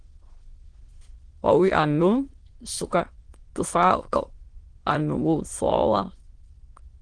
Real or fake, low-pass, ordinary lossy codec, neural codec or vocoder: fake; 9.9 kHz; Opus, 16 kbps; autoencoder, 22.05 kHz, a latent of 192 numbers a frame, VITS, trained on many speakers